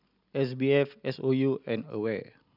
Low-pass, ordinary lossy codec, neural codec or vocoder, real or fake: 5.4 kHz; none; none; real